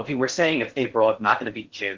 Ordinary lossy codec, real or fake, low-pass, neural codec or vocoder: Opus, 16 kbps; fake; 7.2 kHz; codec, 16 kHz in and 24 kHz out, 0.6 kbps, FocalCodec, streaming, 4096 codes